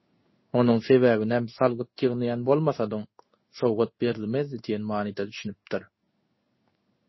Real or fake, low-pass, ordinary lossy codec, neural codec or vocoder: fake; 7.2 kHz; MP3, 24 kbps; codec, 16 kHz in and 24 kHz out, 1 kbps, XY-Tokenizer